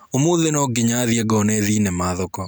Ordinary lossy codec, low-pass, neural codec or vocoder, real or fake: none; none; none; real